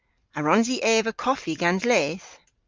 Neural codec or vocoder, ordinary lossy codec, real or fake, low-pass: none; Opus, 32 kbps; real; 7.2 kHz